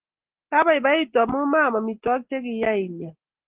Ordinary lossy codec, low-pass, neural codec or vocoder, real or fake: Opus, 16 kbps; 3.6 kHz; none; real